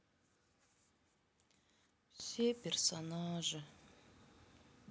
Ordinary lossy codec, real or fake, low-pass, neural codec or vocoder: none; real; none; none